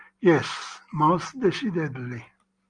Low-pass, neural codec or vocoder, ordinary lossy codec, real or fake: 9.9 kHz; none; Opus, 32 kbps; real